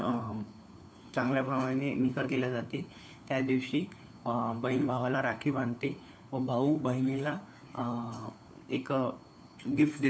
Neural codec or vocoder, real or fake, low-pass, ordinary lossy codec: codec, 16 kHz, 4 kbps, FunCodec, trained on LibriTTS, 50 frames a second; fake; none; none